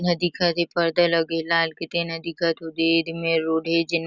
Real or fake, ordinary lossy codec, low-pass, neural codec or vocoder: real; none; 7.2 kHz; none